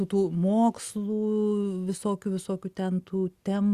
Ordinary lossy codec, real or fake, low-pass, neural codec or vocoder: Opus, 64 kbps; real; 14.4 kHz; none